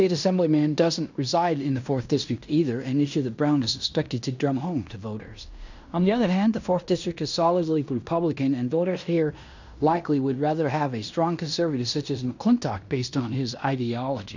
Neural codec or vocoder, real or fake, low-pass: codec, 16 kHz in and 24 kHz out, 0.9 kbps, LongCat-Audio-Codec, fine tuned four codebook decoder; fake; 7.2 kHz